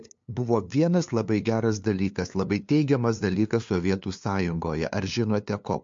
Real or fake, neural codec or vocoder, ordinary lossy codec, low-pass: fake; codec, 16 kHz, 2 kbps, FunCodec, trained on LibriTTS, 25 frames a second; MP3, 48 kbps; 7.2 kHz